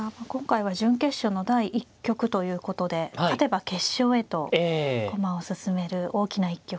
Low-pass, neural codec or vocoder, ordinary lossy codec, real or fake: none; none; none; real